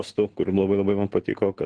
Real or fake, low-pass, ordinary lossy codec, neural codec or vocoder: real; 9.9 kHz; Opus, 16 kbps; none